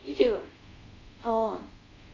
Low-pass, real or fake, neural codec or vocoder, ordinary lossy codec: 7.2 kHz; fake; codec, 24 kHz, 0.5 kbps, DualCodec; AAC, 32 kbps